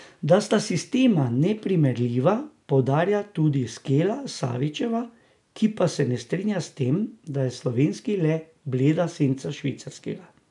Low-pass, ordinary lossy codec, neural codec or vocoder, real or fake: 10.8 kHz; none; none; real